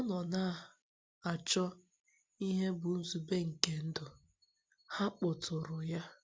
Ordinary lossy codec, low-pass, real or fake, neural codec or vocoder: none; none; real; none